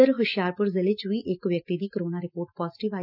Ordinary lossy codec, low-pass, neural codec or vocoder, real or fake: none; 5.4 kHz; none; real